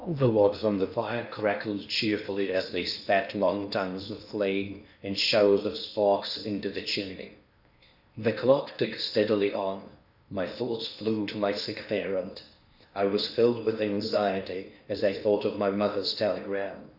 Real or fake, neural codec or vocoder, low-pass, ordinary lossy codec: fake; codec, 16 kHz in and 24 kHz out, 0.8 kbps, FocalCodec, streaming, 65536 codes; 5.4 kHz; Opus, 64 kbps